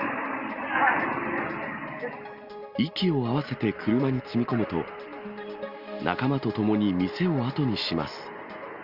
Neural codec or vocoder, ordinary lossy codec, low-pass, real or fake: none; Opus, 32 kbps; 5.4 kHz; real